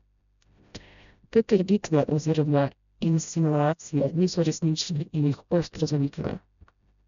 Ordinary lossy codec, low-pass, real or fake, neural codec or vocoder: none; 7.2 kHz; fake; codec, 16 kHz, 0.5 kbps, FreqCodec, smaller model